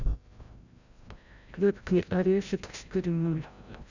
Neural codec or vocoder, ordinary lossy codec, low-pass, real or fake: codec, 16 kHz, 0.5 kbps, FreqCodec, larger model; none; 7.2 kHz; fake